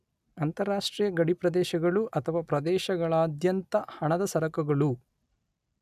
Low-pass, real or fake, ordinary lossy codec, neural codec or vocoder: 14.4 kHz; real; none; none